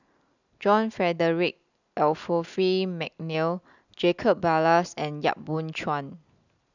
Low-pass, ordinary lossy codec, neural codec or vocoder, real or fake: 7.2 kHz; none; none; real